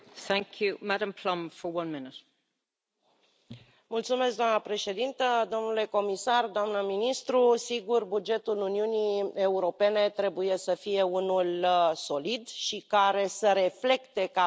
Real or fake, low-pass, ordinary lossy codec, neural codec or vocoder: real; none; none; none